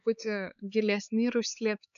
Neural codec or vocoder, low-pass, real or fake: codec, 16 kHz, 4 kbps, X-Codec, HuBERT features, trained on LibriSpeech; 7.2 kHz; fake